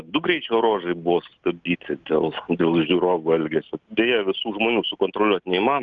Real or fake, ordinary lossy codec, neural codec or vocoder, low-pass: real; Opus, 32 kbps; none; 7.2 kHz